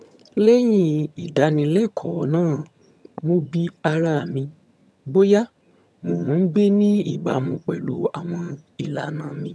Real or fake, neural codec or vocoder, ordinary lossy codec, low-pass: fake; vocoder, 22.05 kHz, 80 mel bands, HiFi-GAN; none; none